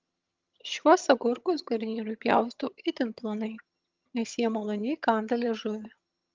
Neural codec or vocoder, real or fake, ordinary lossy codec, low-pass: vocoder, 22.05 kHz, 80 mel bands, HiFi-GAN; fake; Opus, 24 kbps; 7.2 kHz